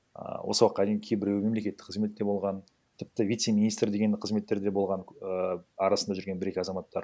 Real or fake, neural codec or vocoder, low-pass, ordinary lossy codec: real; none; none; none